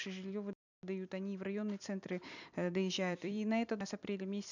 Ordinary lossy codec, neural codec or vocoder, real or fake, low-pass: none; vocoder, 44.1 kHz, 128 mel bands every 256 samples, BigVGAN v2; fake; 7.2 kHz